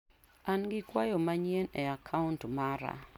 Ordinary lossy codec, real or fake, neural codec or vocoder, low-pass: none; real; none; 19.8 kHz